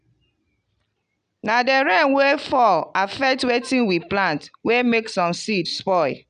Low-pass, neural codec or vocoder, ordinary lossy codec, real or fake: 9.9 kHz; none; none; real